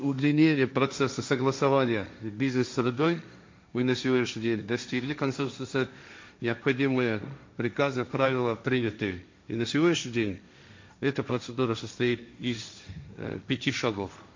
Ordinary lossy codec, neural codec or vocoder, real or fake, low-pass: MP3, 48 kbps; codec, 16 kHz, 1.1 kbps, Voila-Tokenizer; fake; 7.2 kHz